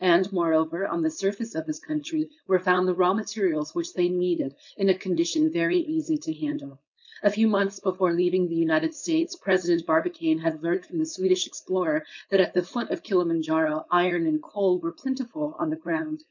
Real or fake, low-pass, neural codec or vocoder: fake; 7.2 kHz; codec, 16 kHz, 4.8 kbps, FACodec